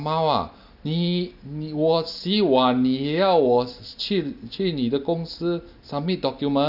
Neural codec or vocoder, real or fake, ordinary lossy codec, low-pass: none; real; none; 5.4 kHz